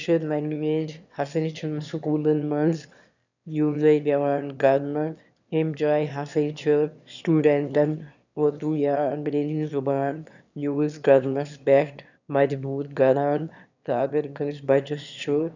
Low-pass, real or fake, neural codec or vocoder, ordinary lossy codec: 7.2 kHz; fake; autoencoder, 22.05 kHz, a latent of 192 numbers a frame, VITS, trained on one speaker; none